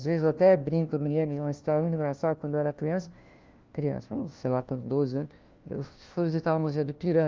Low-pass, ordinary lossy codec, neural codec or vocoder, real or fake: 7.2 kHz; Opus, 32 kbps; codec, 16 kHz, 1 kbps, FunCodec, trained on LibriTTS, 50 frames a second; fake